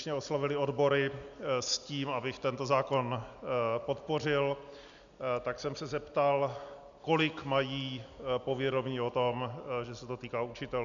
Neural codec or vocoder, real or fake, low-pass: none; real; 7.2 kHz